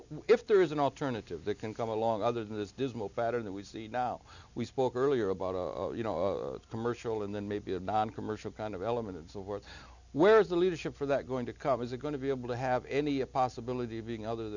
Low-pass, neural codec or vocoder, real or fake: 7.2 kHz; none; real